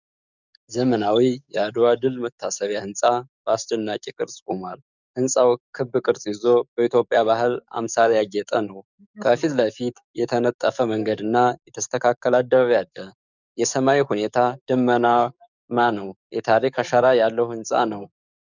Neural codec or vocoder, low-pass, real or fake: codec, 44.1 kHz, 7.8 kbps, DAC; 7.2 kHz; fake